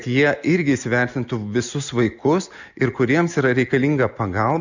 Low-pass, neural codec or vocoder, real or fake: 7.2 kHz; none; real